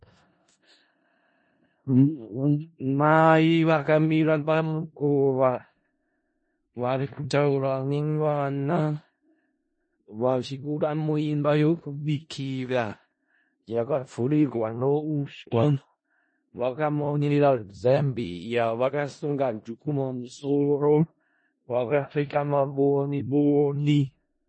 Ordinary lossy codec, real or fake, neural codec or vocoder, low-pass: MP3, 32 kbps; fake; codec, 16 kHz in and 24 kHz out, 0.4 kbps, LongCat-Audio-Codec, four codebook decoder; 9.9 kHz